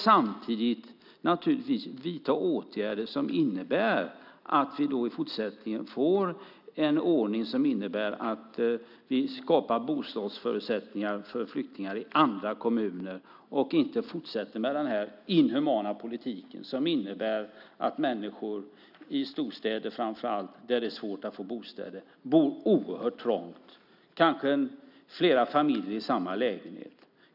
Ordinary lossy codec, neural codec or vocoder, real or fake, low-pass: none; none; real; 5.4 kHz